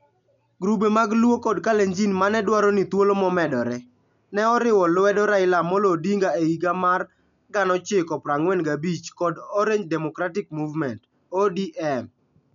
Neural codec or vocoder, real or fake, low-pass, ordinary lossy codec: none; real; 7.2 kHz; none